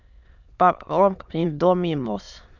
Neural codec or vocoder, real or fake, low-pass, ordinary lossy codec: autoencoder, 22.05 kHz, a latent of 192 numbers a frame, VITS, trained on many speakers; fake; 7.2 kHz; none